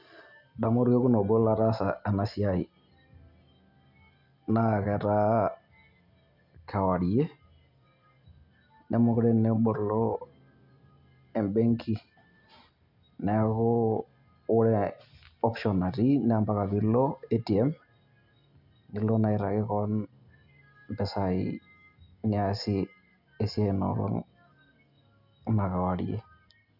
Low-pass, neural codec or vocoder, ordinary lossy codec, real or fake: 5.4 kHz; none; none; real